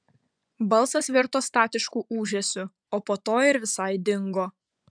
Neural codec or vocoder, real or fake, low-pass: vocoder, 24 kHz, 100 mel bands, Vocos; fake; 9.9 kHz